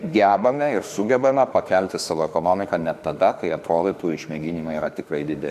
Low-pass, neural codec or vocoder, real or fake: 14.4 kHz; autoencoder, 48 kHz, 32 numbers a frame, DAC-VAE, trained on Japanese speech; fake